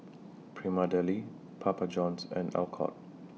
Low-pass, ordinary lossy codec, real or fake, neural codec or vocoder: none; none; real; none